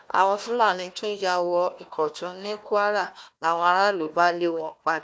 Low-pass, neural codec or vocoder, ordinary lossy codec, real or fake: none; codec, 16 kHz, 1 kbps, FunCodec, trained on Chinese and English, 50 frames a second; none; fake